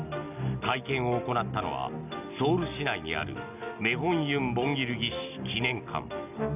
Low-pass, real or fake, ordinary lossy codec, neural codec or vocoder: 3.6 kHz; real; none; none